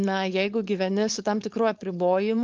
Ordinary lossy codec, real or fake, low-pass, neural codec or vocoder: Opus, 24 kbps; fake; 7.2 kHz; codec, 16 kHz, 4.8 kbps, FACodec